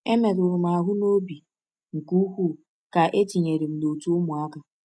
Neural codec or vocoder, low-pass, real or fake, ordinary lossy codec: none; none; real; none